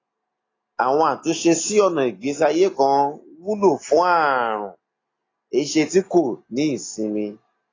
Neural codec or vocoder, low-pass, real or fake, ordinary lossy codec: none; 7.2 kHz; real; AAC, 32 kbps